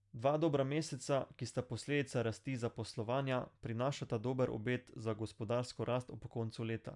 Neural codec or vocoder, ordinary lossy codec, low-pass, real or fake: none; none; 10.8 kHz; real